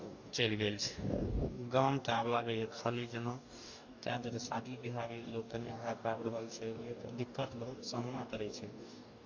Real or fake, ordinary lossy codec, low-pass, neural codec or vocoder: fake; AAC, 48 kbps; 7.2 kHz; codec, 44.1 kHz, 2.6 kbps, DAC